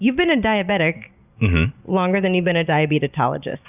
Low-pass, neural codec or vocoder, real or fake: 3.6 kHz; none; real